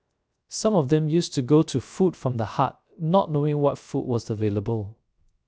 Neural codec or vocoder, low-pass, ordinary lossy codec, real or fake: codec, 16 kHz, 0.3 kbps, FocalCodec; none; none; fake